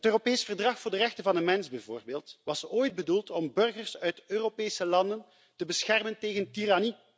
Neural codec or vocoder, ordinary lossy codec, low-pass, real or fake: none; none; none; real